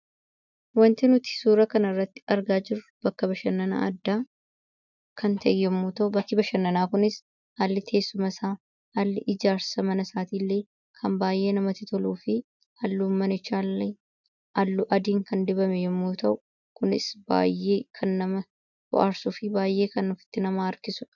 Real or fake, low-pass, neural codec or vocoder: real; 7.2 kHz; none